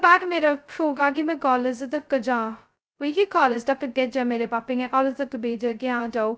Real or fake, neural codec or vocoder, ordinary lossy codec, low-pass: fake; codec, 16 kHz, 0.2 kbps, FocalCodec; none; none